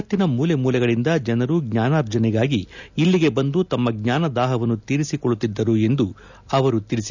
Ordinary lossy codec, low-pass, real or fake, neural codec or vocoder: none; 7.2 kHz; real; none